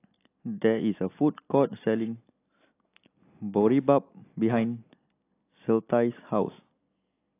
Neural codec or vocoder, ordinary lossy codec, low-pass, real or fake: none; AAC, 24 kbps; 3.6 kHz; real